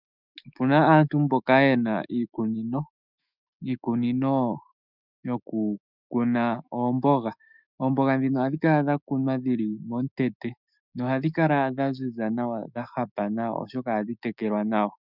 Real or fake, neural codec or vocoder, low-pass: fake; autoencoder, 48 kHz, 128 numbers a frame, DAC-VAE, trained on Japanese speech; 5.4 kHz